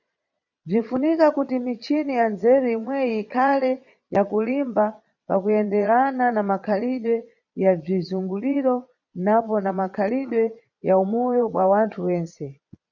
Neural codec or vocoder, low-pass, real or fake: vocoder, 22.05 kHz, 80 mel bands, Vocos; 7.2 kHz; fake